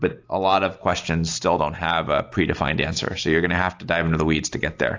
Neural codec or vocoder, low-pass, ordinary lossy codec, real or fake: none; 7.2 kHz; AAC, 48 kbps; real